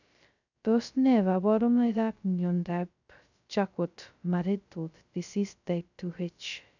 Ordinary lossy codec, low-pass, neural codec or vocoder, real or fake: none; 7.2 kHz; codec, 16 kHz, 0.2 kbps, FocalCodec; fake